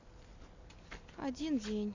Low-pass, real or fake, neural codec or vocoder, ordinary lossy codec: 7.2 kHz; real; none; AAC, 48 kbps